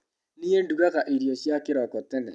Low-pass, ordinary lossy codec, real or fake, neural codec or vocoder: none; none; real; none